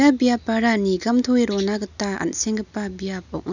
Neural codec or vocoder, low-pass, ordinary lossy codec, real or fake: none; 7.2 kHz; none; real